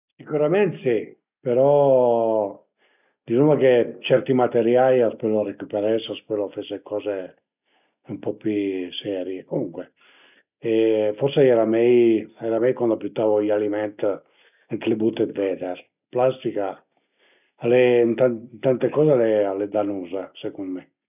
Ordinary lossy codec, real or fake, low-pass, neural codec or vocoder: none; real; 3.6 kHz; none